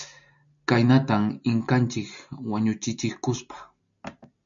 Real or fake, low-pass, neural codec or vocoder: real; 7.2 kHz; none